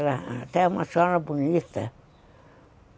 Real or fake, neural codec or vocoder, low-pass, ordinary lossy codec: real; none; none; none